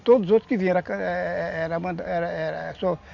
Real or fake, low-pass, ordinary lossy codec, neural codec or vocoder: real; 7.2 kHz; none; none